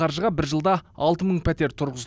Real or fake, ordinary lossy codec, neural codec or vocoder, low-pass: real; none; none; none